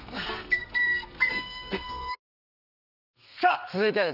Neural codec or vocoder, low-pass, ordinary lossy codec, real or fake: codec, 32 kHz, 1.9 kbps, SNAC; 5.4 kHz; none; fake